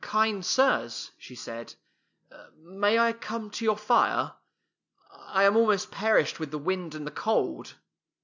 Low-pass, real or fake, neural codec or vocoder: 7.2 kHz; real; none